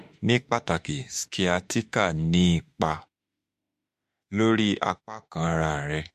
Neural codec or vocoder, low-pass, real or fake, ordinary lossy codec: autoencoder, 48 kHz, 32 numbers a frame, DAC-VAE, trained on Japanese speech; 14.4 kHz; fake; MP3, 64 kbps